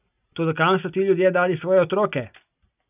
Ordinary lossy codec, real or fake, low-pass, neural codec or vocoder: none; real; 3.6 kHz; none